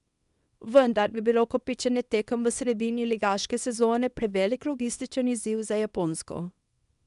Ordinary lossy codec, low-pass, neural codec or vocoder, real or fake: none; 10.8 kHz; codec, 24 kHz, 0.9 kbps, WavTokenizer, small release; fake